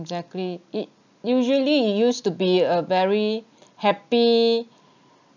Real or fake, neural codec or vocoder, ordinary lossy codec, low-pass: real; none; none; 7.2 kHz